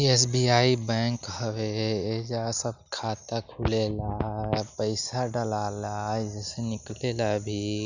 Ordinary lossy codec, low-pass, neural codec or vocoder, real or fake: none; 7.2 kHz; none; real